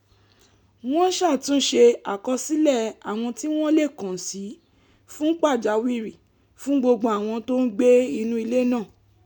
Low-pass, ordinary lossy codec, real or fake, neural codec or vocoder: 19.8 kHz; none; real; none